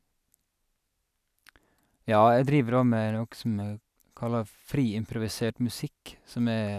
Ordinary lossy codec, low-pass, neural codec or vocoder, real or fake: none; 14.4 kHz; none; real